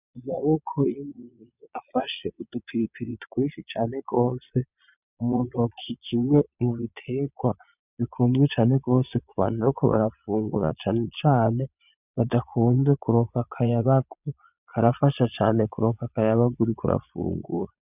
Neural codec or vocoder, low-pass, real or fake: vocoder, 22.05 kHz, 80 mel bands, Vocos; 3.6 kHz; fake